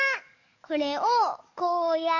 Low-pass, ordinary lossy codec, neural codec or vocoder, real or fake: 7.2 kHz; none; codec, 44.1 kHz, 7.8 kbps, DAC; fake